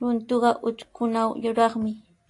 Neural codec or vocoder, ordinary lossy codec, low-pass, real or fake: none; AAC, 48 kbps; 10.8 kHz; real